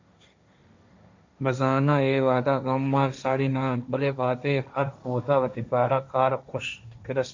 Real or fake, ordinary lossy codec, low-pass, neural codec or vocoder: fake; MP3, 64 kbps; 7.2 kHz; codec, 16 kHz, 1.1 kbps, Voila-Tokenizer